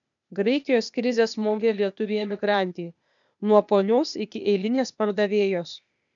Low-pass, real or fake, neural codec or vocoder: 7.2 kHz; fake; codec, 16 kHz, 0.8 kbps, ZipCodec